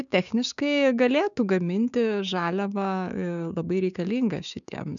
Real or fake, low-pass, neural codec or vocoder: fake; 7.2 kHz; codec, 16 kHz, 6 kbps, DAC